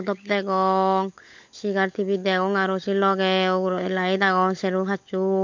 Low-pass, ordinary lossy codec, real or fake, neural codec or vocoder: 7.2 kHz; MP3, 48 kbps; fake; codec, 16 kHz, 16 kbps, FunCodec, trained on LibriTTS, 50 frames a second